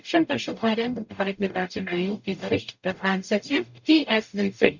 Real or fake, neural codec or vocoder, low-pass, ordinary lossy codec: fake; codec, 44.1 kHz, 0.9 kbps, DAC; 7.2 kHz; none